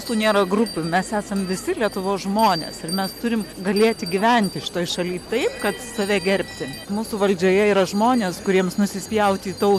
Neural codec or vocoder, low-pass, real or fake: none; 14.4 kHz; real